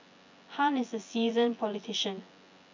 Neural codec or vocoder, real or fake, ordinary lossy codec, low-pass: vocoder, 24 kHz, 100 mel bands, Vocos; fake; MP3, 64 kbps; 7.2 kHz